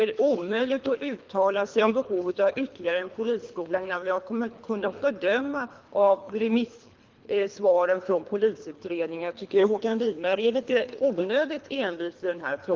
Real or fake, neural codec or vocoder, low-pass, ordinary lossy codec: fake; codec, 24 kHz, 3 kbps, HILCodec; 7.2 kHz; Opus, 32 kbps